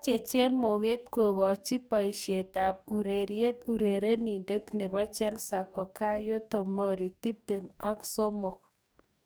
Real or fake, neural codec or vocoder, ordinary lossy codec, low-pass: fake; codec, 44.1 kHz, 2.6 kbps, DAC; none; none